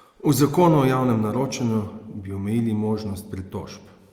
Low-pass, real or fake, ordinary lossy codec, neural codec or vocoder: 19.8 kHz; real; Opus, 32 kbps; none